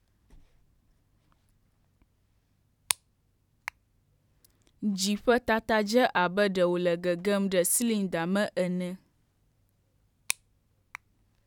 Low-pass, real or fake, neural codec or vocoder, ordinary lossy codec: 19.8 kHz; fake; vocoder, 44.1 kHz, 128 mel bands every 512 samples, BigVGAN v2; none